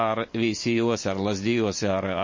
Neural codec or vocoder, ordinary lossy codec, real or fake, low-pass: vocoder, 44.1 kHz, 128 mel bands every 512 samples, BigVGAN v2; MP3, 32 kbps; fake; 7.2 kHz